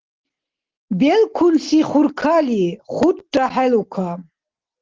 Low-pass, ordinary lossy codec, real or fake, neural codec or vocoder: 7.2 kHz; Opus, 16 kbps; real; none